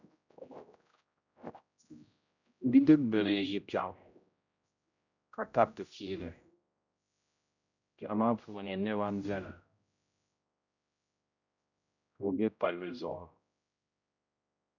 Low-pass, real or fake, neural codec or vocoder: 7.2 kHz; fake; codec, 16 kHz, 0.5 kbps, X-Codec, HuBERT features, trained on general audio